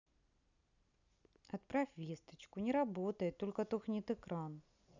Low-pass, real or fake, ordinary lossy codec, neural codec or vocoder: 7.2 kHz; real; none; none